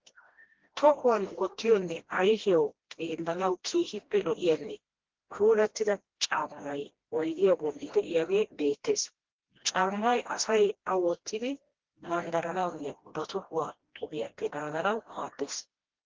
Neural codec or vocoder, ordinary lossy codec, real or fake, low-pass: codec, 16 kHz, 1 kbps, FreqCodec, smaller model; Opus, 16 kbps; fake; 7.2 kHz